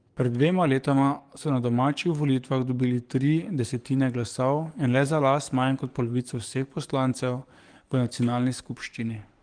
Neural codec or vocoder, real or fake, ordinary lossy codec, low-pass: codec, 44.1 kHz, 7.8 kbps, DAC; fake; Opus, 24 kbps; 9.9 kHz